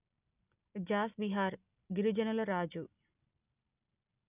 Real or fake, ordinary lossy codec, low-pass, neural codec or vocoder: real; none; 3.6 kHz; none